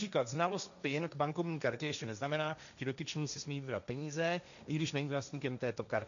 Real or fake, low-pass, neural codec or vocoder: fake; 7.2 kHz; codec, 16 kHz, 1.1 kbps, Voila-Tokenizer